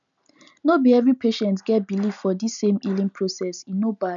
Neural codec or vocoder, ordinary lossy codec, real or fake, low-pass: none; none; real; 7.2 kHz